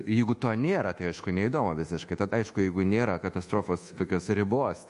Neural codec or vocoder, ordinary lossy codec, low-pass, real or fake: codec, 24 kHz, 1.2 kbps, DualCodec; MP3, 48 kbps; 10.8 kHz; fake